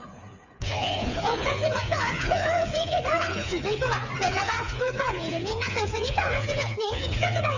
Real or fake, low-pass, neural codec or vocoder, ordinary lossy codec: fake; 7.2 kHz; codec, 16 kHz, 4 kbps, FreqCodec, smaller model; none